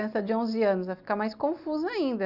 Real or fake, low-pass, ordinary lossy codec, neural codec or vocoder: real; 5.4 kHz; none; none